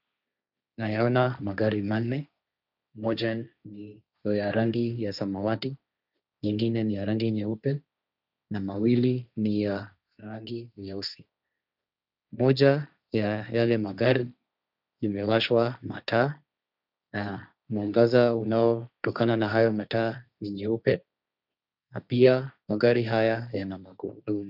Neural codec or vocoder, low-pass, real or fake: codec, 16 kHz, 1.1 kbps, Voila-Tokenizer; 5.4 kHz; fake